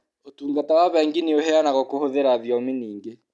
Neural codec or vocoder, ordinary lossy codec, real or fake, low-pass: none; none; real; none